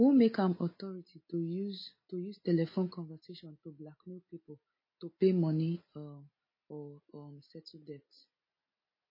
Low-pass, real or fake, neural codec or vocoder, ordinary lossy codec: 5.4 kHz; real; none; MP3, 24 kbps